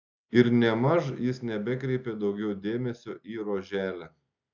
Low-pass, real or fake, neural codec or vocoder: 7.2 kHz; real; none